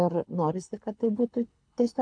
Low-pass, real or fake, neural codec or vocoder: 9.9 kHz; fake; codec, 24 kHz, 6 kbps, HILCodec